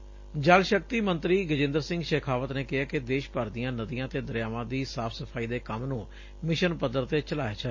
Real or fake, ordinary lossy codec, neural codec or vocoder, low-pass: real; MP3, 32 kbps; none; 7.2 kHz